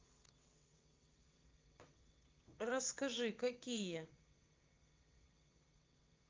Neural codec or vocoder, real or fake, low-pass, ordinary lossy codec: vocoder, 22.05 kHz, 80 mel bands, WaveNeXt; fake; 7.2 kHz; Opus, 32 kbps